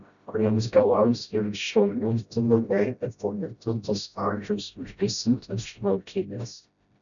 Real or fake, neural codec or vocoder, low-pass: fake; codec, 16 kHz, 0.5 kbps, FreqCodec, smaller model; 7.2 kHz